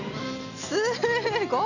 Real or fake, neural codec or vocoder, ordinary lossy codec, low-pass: real; none; none; 7.2 kHz